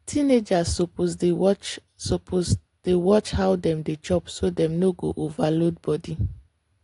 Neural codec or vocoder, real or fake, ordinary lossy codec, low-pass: none; real; AAC, 48 kbps; 10.8 kHz